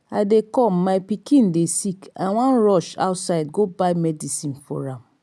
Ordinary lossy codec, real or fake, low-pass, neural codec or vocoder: none; real; none; none